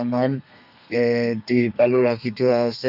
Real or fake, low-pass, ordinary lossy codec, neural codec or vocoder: fake; 5.4 kHz; none; codec, 32 kHz, 1.9 kbps, SNAC